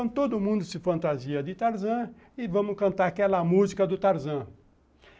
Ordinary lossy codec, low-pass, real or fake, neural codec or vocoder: none; none; real; none